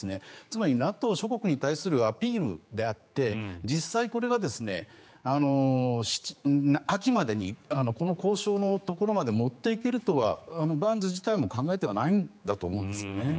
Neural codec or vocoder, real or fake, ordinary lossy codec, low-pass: codec, 16 kHz, 4 kbps, X-Codec, HuBERT features, trained on general audio; fake; none; none